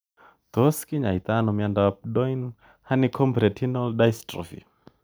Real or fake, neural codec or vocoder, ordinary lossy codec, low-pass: real; none; none; none